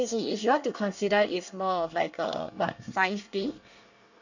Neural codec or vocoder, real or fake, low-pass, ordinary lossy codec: codec, 24 kHz, 1 kbps, SNAC; fake; 7.2 kHz; none